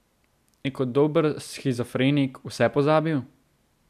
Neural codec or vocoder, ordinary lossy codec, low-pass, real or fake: none; none; 14.4 kHz; real